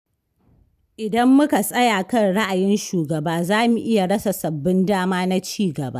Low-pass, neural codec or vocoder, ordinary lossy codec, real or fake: 14.4 kHz; none; none; real